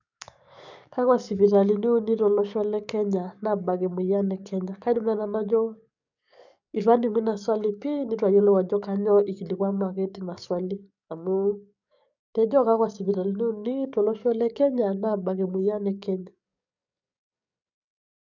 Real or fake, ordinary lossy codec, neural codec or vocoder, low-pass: fake; none; codec, 44.1 kHz, 7.8 kbps, DAC; 7.2 kHz